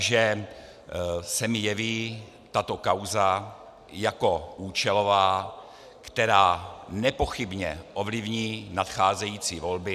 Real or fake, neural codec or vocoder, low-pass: real; none; 14.4 kHz